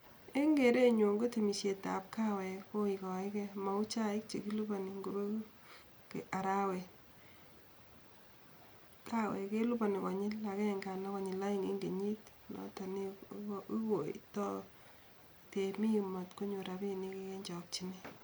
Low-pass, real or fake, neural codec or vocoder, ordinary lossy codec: none; real; none; none